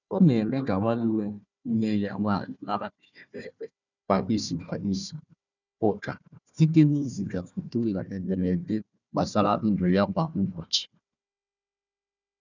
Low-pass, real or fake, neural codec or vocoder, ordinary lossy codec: 7.2 kHz; fake; codec, 16 kHz, 1 kbps, FunCodec, trained on Chinese and English, 50 frames a second; none